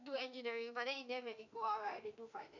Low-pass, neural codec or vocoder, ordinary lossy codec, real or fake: 7.2 kHz; autoencoder, 48 kHz, 32 numbers a frame, DAC-VAE, trained on Japanese speech; none; fake